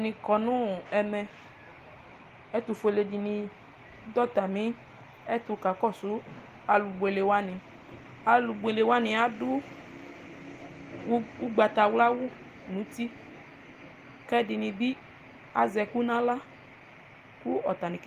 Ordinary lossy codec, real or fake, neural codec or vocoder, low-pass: Opus, 24 kbps; real; none; 14.4 kHz